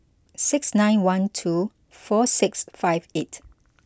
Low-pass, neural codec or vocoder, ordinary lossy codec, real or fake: none; none; none; real